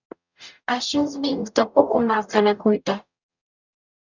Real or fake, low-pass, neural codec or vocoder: fake; 7.2 kHz; codec, 44.1 kHz, 0.9 kbps, DAC